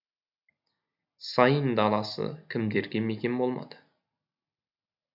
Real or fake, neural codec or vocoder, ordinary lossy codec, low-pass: real; none; none; 5.4 kHz